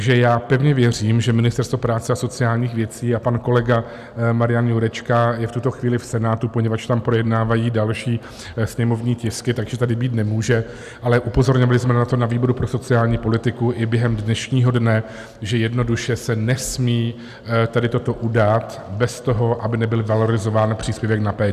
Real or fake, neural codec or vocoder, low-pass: real; none; 14.4 kHz